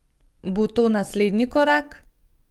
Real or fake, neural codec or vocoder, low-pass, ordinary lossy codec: fake; codec, 44.1 kHz, 7.8 kbps, DAC; 19.8 kHz; Opus, 32 kbps